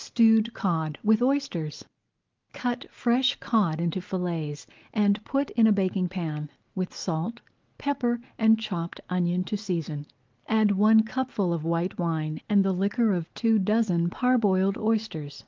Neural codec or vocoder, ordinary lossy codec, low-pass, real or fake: none; Opus, 32 kbps; 7.2 kHz; real